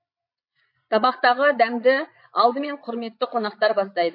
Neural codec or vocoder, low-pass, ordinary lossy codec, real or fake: vocoder, 22.05 kHz, 80 mel bands, Vocos; 5.4 kHz; MP3, 32 kbps; fake